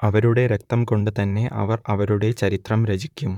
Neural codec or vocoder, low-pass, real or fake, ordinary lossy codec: vocoder, 44.1 kHz, 128 mel bands, Pupu-Vocoder; 19.8 kHz; fake; none